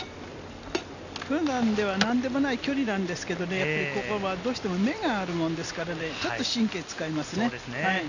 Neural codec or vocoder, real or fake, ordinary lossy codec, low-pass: none; real; none; 7.2 kHz